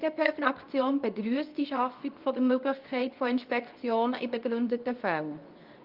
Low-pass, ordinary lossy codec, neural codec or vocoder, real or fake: 5.4 kHz; Opus, 32 kbps; codec, 24 kHz, 0.9 kbps, WavTokenizer, medium speech release version 2; fake